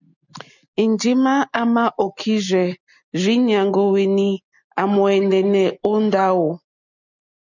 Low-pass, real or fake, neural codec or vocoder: 7.2 kHz; real; none